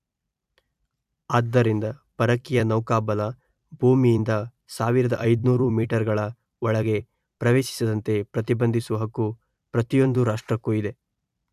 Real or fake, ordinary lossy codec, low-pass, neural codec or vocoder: fake; AAC, 96 kbps; 14.4 kHz; vocoder, 44.1 kHz, 128 mel bands every 256 samples, BigVGAN v2